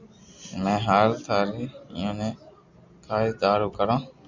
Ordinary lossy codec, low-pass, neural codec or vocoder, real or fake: Opus, 64 kbps; 7.2 kHz; none; real